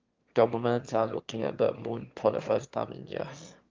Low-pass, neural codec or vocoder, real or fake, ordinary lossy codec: 7.2 kHz; autoencoder, 22.05 kHz, a latent of 192 numbers a frame, VITS, trained on one speaker; fake; Opus, 24 kbps